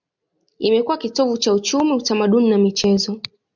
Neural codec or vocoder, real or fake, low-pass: none; real; 7.2 kHz